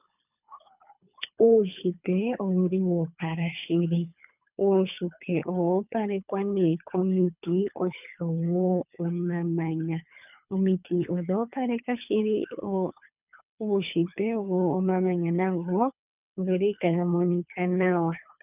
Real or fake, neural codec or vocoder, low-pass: fake; codec, 24 kHz, 3 kbps, HILCodec; 3.6 kHz